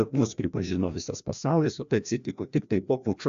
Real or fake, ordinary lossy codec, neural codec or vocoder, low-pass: fake; AAC, 64 kbps; codec, 16 kHz, 1 kbps, FreqCodec, larger model; 7.2 kHz